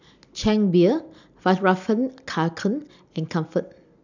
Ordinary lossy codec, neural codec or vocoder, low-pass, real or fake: none; none; 7.2 kHz; real